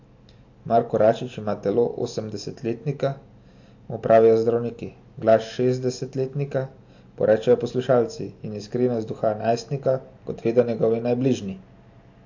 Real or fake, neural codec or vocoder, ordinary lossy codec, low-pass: real; none; AAC, 48 kbps; 7.2 kHz